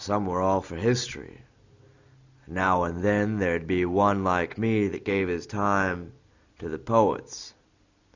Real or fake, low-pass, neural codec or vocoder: real; 7.2 kHz; none